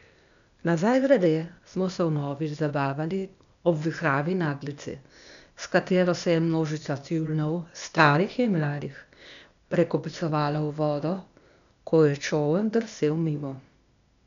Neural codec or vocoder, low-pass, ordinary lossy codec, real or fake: codec, 16 kHz, 0.8 kbps, ZipCodec; 7.2 kHz; none; fake